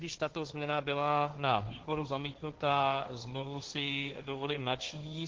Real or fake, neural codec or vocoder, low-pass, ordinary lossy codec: fake; codec, 16 kHz, 1.1 kbps, Voila-Tokenizer; 7.2 kHz; Opus, 16 kbps